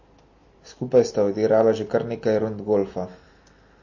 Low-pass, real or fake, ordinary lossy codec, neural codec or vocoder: 7.2 kHz; real; MP3, 32 kbps; none